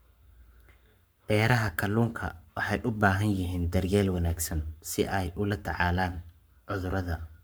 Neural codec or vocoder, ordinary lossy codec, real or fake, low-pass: codec, 44.1 kHz, 7.8 kbps, Pupu-Codec; none; fake; none